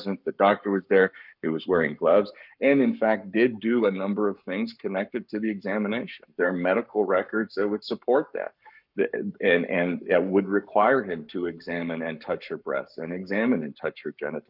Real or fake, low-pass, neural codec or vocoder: fake; 5.4 kHz; codec, 44.1 kHz, 7.8 kbps, Pupu-Codec